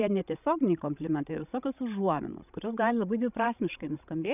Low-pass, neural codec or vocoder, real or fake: 3.6 kHz; codec, 16 kHz, 16 kbps, FreqCodec, larger model; fake